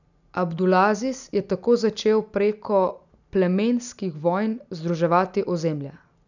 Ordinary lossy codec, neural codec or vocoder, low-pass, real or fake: none; none; 7.2 kHz; real